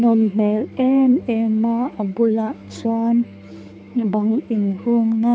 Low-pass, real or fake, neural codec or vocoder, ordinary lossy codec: none; fake; codec, 16 kHz, 4 kbps, X-Codec, HuBERT features, trained on balanced general audio; none